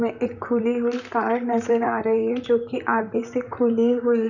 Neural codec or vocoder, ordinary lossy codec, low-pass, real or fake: vocoder, 44.1 kHz, 128 mel bands, Pupu-Vocoder; none; 7.2 kHz; fake